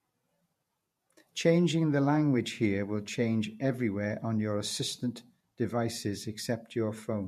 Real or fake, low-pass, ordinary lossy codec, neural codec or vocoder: fake; 14.4 kHz; MP3, 64 kbps; vocoder, 48 kHz, 128 mel bands, Vocos